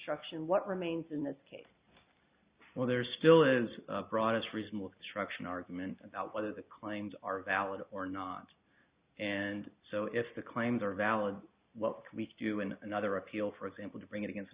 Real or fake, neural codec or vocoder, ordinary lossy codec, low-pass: real; none; Opus, 64 kbps; 3.6 kHz